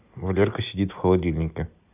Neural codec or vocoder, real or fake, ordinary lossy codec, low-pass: none; real; none; 3.6 kHz